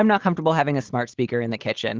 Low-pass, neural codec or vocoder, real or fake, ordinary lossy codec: 7.2 kHz; none; real; Opus, 16 kbps